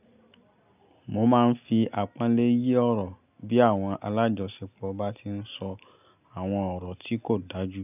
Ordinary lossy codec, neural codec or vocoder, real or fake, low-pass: none; none; real; 3.6 kHz